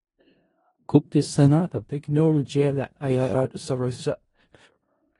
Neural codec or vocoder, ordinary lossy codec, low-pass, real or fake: codec, 16 kHz in and 24 kHz out, 0.4 kbps, LongCat-Audio-Codec, four codebook decoder; AAC, 32 kbps; 10.8 kHz; fake